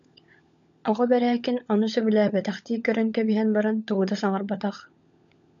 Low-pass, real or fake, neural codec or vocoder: 7.2 kHz; fake; codec, 16 kHz, 16 kbps, FunCodec, trained on LibriTTS, 50 frames a second